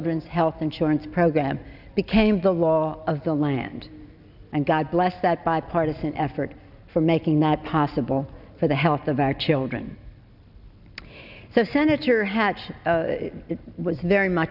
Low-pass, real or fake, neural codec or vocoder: 5.4 kHz; real; none